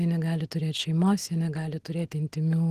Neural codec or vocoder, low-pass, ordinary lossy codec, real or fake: none; 14.4 kHz; Opus, 16 kbps; real